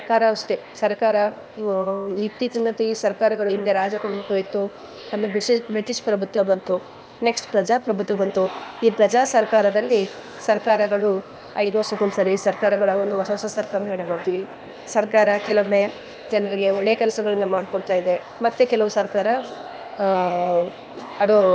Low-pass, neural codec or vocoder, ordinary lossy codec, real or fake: none; codec, 16 kHz, 0.8 kbps, ZipCodec; none; fake